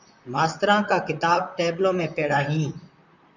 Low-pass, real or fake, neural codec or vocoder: 7.2 kHz; fake; vocoder, 44.1 kHz, 128 mel bands, Pupu-Vocoder